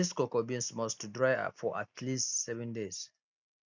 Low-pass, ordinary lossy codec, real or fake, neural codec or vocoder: 7.2 kHz; none; real; none